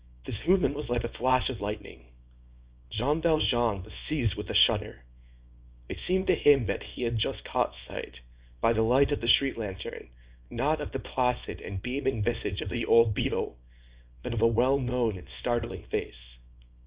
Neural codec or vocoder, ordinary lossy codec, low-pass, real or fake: codec, 24 kHz, 0.9 kbps, WavTokenizer, small release; Opus, 64 kbps; 3.6 kHz; fake